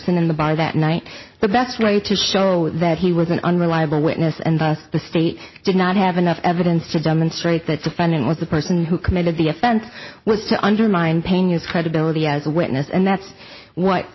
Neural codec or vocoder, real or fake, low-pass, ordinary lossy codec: none; real; 7.2 kHz; MP3, 24 kbps